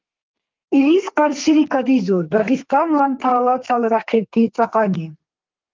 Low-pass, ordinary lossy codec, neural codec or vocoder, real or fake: 7.2 kHz; Opus, 24 kbps; codec, 32 kHz, 1.9 kbps, SNAC; fake